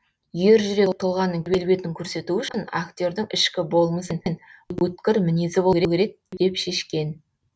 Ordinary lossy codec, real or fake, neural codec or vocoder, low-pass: none; real; none; none